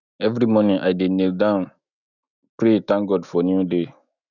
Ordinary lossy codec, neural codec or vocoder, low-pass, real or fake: none; autoencoder, 48 kHz, 128 numbers a frame, DAC-VAE, trained on Japanese speech; 7.2 kHz; fake